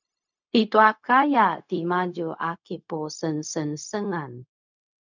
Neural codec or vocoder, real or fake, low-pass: codec, 16 kHz, 0.4 kbps, LongCat-Audio-Codec; fake; 7.2 kHz